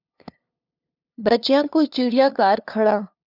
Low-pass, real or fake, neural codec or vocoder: 5.4 kHz; fake; codec, 16 kHz, 2 kbps, FunCodec, trained on LibriTTS, 25 frames a second